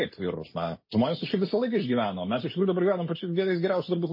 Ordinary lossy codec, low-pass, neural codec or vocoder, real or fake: MP3, 24 kbps; 5.4 kHz; none; real